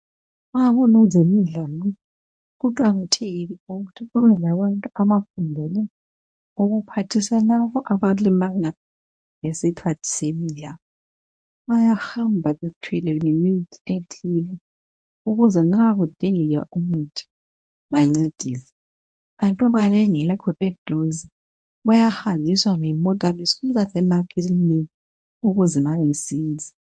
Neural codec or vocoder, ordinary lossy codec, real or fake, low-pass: codec, 24 kHz, 0.9 kbps, WavTokenizer, medium speech release version 1; MP3, 48 kbps; fake; 9.9 kHz